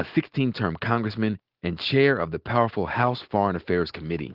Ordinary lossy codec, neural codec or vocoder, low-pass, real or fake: Opus, 16 kbps; none; 5.4 kHz; real